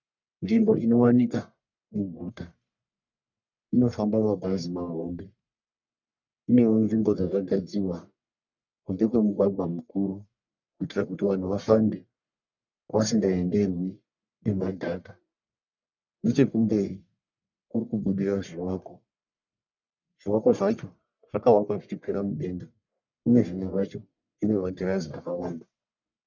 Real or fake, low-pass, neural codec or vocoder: fake; 7.2 kHz; codec, 44.1 kHz, 1.7 kbps, Pupu-Codec